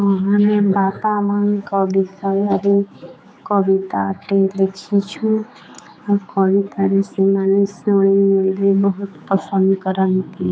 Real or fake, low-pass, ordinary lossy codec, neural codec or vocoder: fake; none; none; codec, 16 kHz, 4 kbps, X-Codec, HuBERT features, trained on general audio